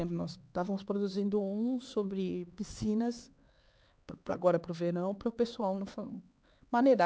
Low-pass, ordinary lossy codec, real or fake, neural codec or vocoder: none; none; fake; codec, 16 kHz, 4 kbps, X-Codec, HuBERT features, trained on LibriSpeech